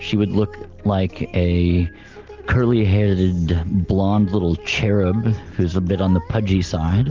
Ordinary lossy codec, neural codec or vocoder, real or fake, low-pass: Opus, 32 kbps; none; real; 7.2 kHz